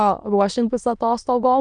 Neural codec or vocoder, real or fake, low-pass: autoencoder, 22.05 kHz, a latent of 192 numbers a frame, VITS, trained on many speakers; fake; 9.9 kHz